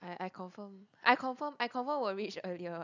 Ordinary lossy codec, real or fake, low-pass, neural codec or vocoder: none; real; 7.2 kHz; none